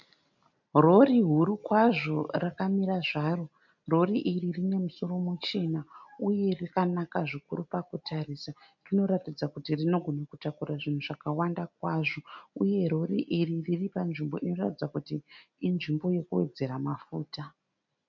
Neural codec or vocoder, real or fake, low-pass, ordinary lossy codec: none; real; 7.2 kHz; MP3, 64 kbps